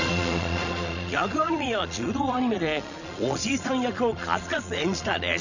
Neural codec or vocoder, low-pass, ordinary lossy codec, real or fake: vocoder, 22.05 kHz, 80 mel bands, Vocos; 7.2 kHz; none; fake